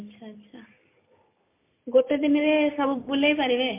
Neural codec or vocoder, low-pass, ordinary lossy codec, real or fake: none; 3.6 kHz; MP3, 24 kbps; real